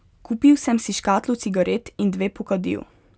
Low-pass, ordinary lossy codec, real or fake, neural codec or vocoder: none; none; real; none